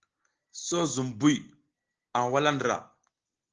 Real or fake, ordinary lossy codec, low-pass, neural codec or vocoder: real; Opus, 16 kbps; 7.2 kHz; none